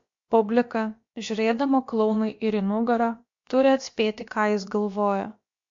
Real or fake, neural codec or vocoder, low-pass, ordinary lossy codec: fake; codec, 16 kHz, about 1 kbps, DyCAST, with the encoder's durations; 7.2 kHz; MP3, 48 kbps